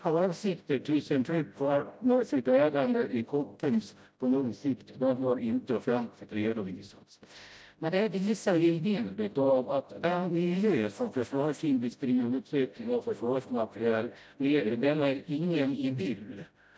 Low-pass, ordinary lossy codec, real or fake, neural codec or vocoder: none; none; fake; codec, 16 kHz, 0.5 kbps, FreqCodec, smaller model